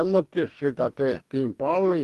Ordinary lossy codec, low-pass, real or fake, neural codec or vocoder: Opus, 32 kbps; 14.4 kHz; fake; codec, 44.1 kHz, 2.6 kbps, DAC